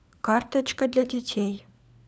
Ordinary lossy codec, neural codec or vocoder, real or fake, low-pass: none; codec, 16 kHz, 4 kbps, FunCodec, trained on LibriTTS, 50 frames a second; fake; none